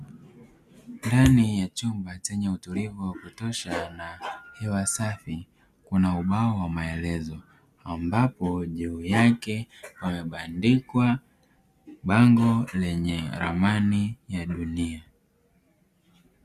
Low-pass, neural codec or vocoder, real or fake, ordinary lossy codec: 14.4 kHz; none; real; Opus, 64 kbps